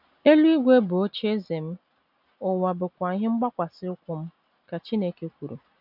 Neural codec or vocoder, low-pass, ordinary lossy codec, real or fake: none; 5.4 kHz; none; real